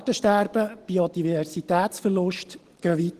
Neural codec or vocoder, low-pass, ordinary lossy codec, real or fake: none; 14.4 kHz; Opus, 16 kbps; real